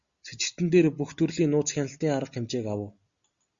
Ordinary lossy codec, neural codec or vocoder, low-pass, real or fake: Opus, 64 kbps; none; 7.2 kHz; real